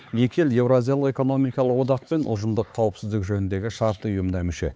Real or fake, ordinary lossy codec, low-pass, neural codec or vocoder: fake; none; none; codec, 16 kHz, 4 kbps, X-Codec, HuBERT features, trained on LibriSpeech